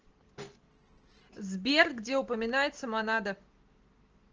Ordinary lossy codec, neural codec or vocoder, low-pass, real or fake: Opus, 16 kbps; none; 7.2 kHz; real